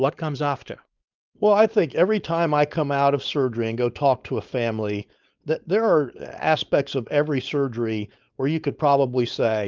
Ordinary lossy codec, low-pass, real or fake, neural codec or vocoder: Opus, 24 kbps; 7.2 kHz; fake; codec, 16 kHz, 4.8 kbps, FACodec